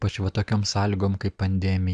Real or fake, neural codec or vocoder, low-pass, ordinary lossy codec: real; none; 9.9 kHz; Opus, 64 kbps